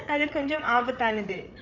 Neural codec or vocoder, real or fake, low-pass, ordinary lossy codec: codec, 16 kHz, 8 kbps, FreqCodec, larger model; fake; 7.2 kHz; none